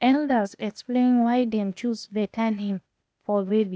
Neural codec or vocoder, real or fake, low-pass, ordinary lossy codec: codec, 16 kHz, 0.8 kbps, ZipCodec; fake; none; none